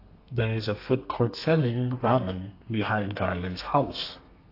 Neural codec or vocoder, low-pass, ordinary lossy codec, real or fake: codec, 32 kHz, 1.9 kbps, SNAC; 5.4 kHz; AAC, 32 kbps; fake